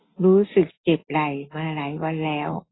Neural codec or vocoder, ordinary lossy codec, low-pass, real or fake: none; AAC, 16 kbps; 7.2 kHz; real